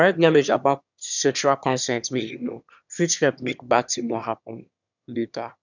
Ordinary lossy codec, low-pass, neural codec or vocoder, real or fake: none; 7.2 kHz; autoencoder, 22.05 kHz, a latent of 192 numbers a frame, VITS, trained on one speaker; fake